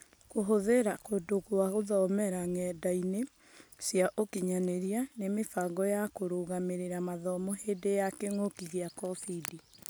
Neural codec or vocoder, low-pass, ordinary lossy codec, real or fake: none; none; none; real